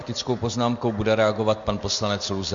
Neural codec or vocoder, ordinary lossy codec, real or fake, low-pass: none; MP3, 48 kbps; real; 7.2 kHz